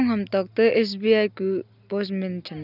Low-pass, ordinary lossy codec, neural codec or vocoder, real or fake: 5.4 kHz; none; none; real